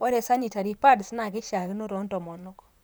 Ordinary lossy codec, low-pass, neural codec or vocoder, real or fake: none; none; vocoder, 44.1 kHz, 128 mel bands, Pupu-Vocoder; fake